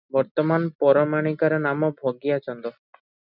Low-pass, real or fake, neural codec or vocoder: 5.4 kHz; real; none